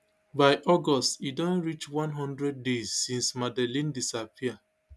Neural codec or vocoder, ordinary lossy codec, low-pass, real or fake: none; none; none; real